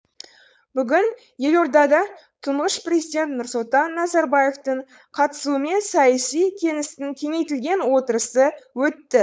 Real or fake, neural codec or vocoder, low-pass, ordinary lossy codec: fake; codec, 16 kHz, 4.8 kbps, FACodec; none; none